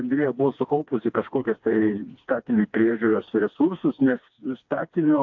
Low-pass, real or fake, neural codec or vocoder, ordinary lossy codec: 7.2 kHz; fake; codec, 16 kHz, 2 kbps, FreqCodec, smaller model; AAC, 48 kbps